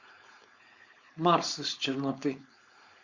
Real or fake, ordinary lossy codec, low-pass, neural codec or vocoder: fake; Opus, 64 kbps; 7.2 kHz; codec, 16 kHz, 4.8 kbps, FACodec